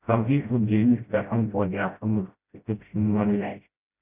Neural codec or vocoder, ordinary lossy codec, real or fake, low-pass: codec, 16 kHz, 0.5 kbps, FreqCodec, smaller model; none; fake; 3.6 kHz